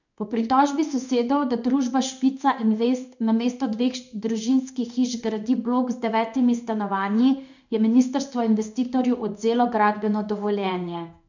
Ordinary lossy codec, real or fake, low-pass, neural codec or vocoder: none; fake; 7.2 kHz; codec, 16 kHz in and 24 kHz out, 1 kbps, XY-Tokenizer